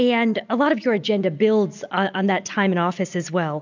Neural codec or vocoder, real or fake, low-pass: none; real; 7.2 kHz